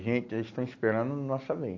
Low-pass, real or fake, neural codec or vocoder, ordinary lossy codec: 7.2 kHz; real; none; AAC, 48 kbps